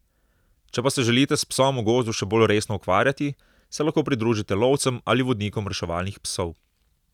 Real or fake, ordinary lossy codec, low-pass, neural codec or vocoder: real; none; 19.8 kHz; none